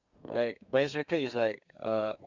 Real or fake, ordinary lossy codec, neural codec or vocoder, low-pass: fake; Opus, 64 kbps; codec, 32 kHz, 1.9 kbps, SNAC; 7.2 kHz